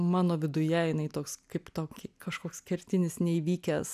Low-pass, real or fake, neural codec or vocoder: 14.4 kHz; real; none